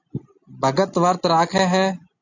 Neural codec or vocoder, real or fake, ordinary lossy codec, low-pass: none; real; AAC, 48 kbps; 7.2 kHz